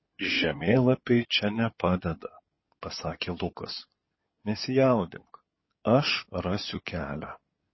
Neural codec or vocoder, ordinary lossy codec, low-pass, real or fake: codec, 16 kHz in and 24 kHz out, 2.2 kbps, FireRedTTS-2 codec; MP3, 24 kbps; 7.2 kHz; fake